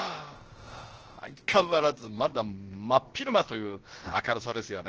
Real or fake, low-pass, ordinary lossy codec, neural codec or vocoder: fake; 7.2 kHz; Opus, 16 kbps; codec, 16 kHz, about 1 kbps, DyCAST, with the encoder's durations